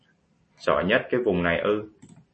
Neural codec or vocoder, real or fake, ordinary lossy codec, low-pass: none; real; MP3, 32 kbps; 10.8 kHz